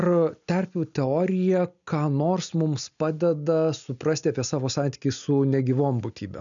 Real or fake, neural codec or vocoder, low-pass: real; none; 7.2 kHz